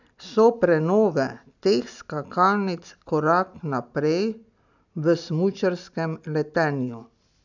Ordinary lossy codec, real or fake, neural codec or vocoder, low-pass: none; real; none; 7.2 kHz